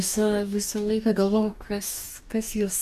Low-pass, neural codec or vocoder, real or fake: 14.4 kHz; codec, 44.1 kHz, 2.6 kbps, DAC; fake